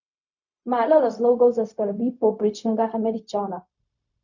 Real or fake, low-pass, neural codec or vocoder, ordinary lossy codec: fake; 7.2 kHz; codec, 16 kHz, 0.4 kbps, LongCat-Audio-Codec; none